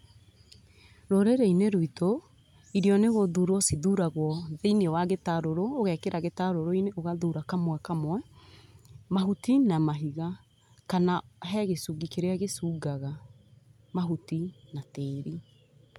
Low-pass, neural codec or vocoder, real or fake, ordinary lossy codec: 19.8 kHz; none; real; none